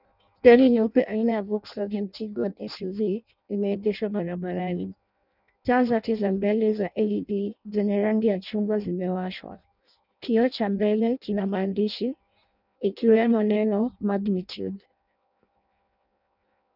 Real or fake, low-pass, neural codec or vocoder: fake; 5.4 kHz; codec, 16 kHz in and 24 kHz out, 0.6 kbps, FireRedTTS-2 codec